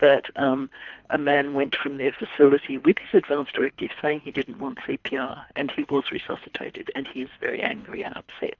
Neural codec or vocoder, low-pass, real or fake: codec, 24 kHz, 3 kbps, HILCodec; 7.2 kHz; fake